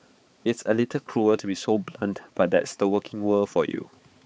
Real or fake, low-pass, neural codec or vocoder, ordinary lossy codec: fake; none; codec, 16 kHz, 4 kbps, X-Codec, HuBERT features, trained on balanced general audio; none